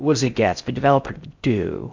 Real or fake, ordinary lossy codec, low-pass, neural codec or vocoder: fake; MP3, 64 kbps; 7.2 kHz; codec, 16 kHz in and 24 kHz out, 0.6 kbps, FocalCodec, streaming, 4096 codes